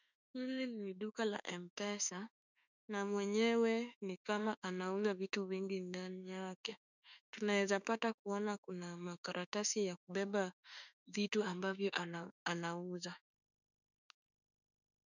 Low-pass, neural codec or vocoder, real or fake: 7.2 kHz; autoencoder, 48 kHz, 32 numbers a frame, DAC-VAE, trained on Japanese speech; fake